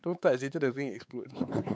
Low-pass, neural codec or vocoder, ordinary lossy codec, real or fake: none; codec, 16 kHz, 4 kbps, X-Codec, WavLM features, trained on Multilingual LibriSpeech; none; fake